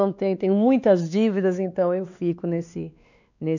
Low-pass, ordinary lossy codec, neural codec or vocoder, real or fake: 7.2 kHz; none; codec, 16 kHz, 2 kbps, X-Codec, WavLM features, trained on Multilingual LibriSpeech; fake